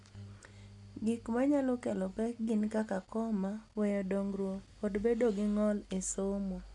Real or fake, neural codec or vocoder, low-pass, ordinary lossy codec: real; none; 10.8 kHz; none